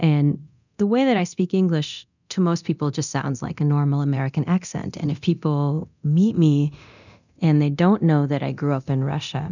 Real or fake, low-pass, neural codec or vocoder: fake; 7.2 kHz; codec, 24 kHz, 0.9 kbps, DualCodec